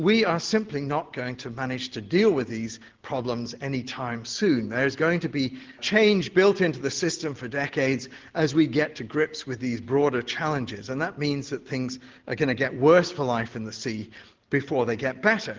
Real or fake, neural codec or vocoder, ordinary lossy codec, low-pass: real; none; Opus, 16 kbps; 7.2 kHz